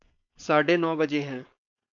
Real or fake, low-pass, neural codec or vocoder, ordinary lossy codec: fake; 7.2 kHz; codec, 16 kHz, 4.8 kbps, FACodec; MP3, 64 kbps